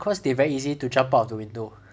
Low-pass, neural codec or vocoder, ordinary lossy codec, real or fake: none; none; none; real